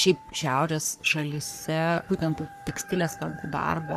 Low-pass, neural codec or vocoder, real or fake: 14.4 kHz; codec, 44.1 kHz, 3.4 kbps, Pupu-Codec; fake